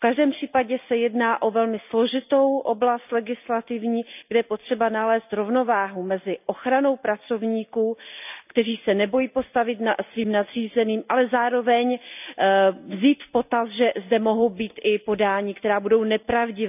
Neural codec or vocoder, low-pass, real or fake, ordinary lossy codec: none; 3.6 kHz; real; none